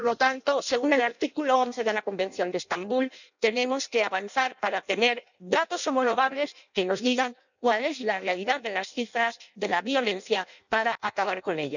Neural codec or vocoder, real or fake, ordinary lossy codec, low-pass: codec, 16 kHz in and 24 kHz out, 0.6 kbps, FireRedTTS-2 codec; fake; none; 7.2 kHz